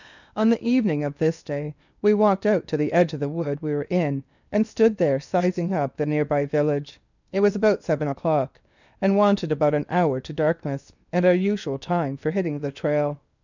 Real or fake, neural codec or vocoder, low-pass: fake; codec, 16 kHz in and 24 kHz out, 0.8 kbps, FocalCodec, streaming, 65536 codes; 7.2 kHz